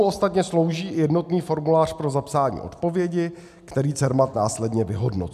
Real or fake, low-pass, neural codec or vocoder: real; 14.4 kHz; none